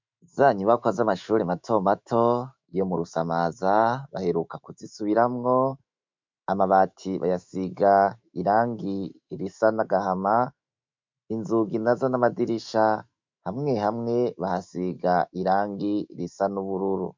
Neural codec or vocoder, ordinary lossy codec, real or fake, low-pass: autoencoder, 48 kHz, 128 numbers a frame, DAC-VAE, trained on Japanese speech; MP3, 64 kbps; fake; 7.2 kHz